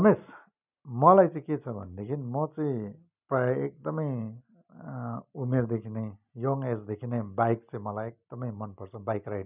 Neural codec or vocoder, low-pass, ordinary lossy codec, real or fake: none; 3.6 kHz; none; real